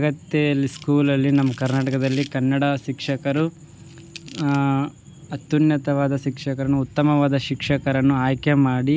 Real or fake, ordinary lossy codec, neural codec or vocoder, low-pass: real; none; none; none